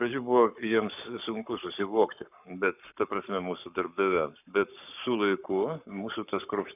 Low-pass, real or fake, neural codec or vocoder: 3.6 kHz; fake; codec, 44.1 kHz, 7.8 kbps, Pupu-Codec